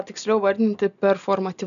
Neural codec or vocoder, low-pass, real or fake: none; 7.2 kHz; real